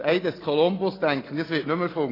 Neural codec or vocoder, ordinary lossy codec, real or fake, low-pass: none; AAC, 24 kbps; real; 5.4 kHz